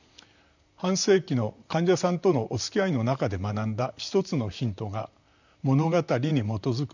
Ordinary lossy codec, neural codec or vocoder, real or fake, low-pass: none; vocoder, 44.1 kHz, 128 mel bands, Pupu-Vocoder; fake; 7.2 kHz